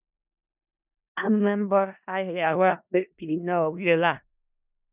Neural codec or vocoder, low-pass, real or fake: codec, 16 kHz in and 24 kHz out, 0.4 kbps, LongCat-Audio-Codec, four codebook decoder; 3.6 kHz; fake